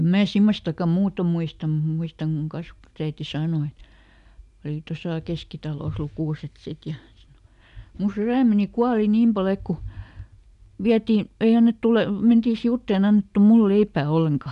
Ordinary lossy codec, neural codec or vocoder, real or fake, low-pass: none; none; real; 10.8 kHz